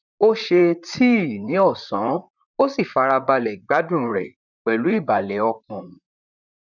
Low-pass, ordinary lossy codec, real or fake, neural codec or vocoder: 7.2 kHz; none; fake; vocoder, 44.1 kHz, 128 mel bands, Pupu-Vocoder